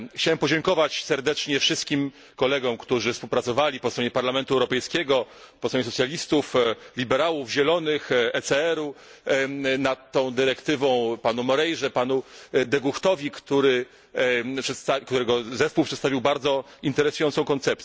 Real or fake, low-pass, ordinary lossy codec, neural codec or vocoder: real; none; none; none